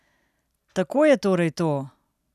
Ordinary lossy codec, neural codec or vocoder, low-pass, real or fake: none; none; 14.4 kHz; real